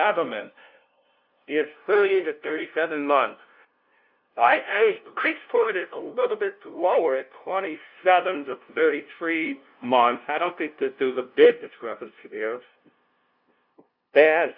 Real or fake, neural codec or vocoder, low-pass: fake; codec, 16 kHz, 0.5 kbps, FunCodec, trained on LibriTTS, 25 frames a second; 5.4 kHz